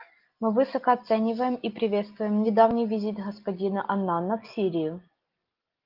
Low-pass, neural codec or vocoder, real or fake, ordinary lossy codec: 5.4 kHz; none; real; Opus, 32 kbps